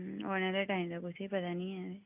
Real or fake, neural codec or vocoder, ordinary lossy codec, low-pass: real; none; none; 3.6 kHz